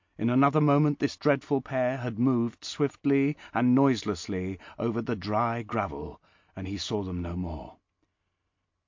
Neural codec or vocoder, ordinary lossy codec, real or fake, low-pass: none; MP3, 48 kbps; real; 7.2 kHz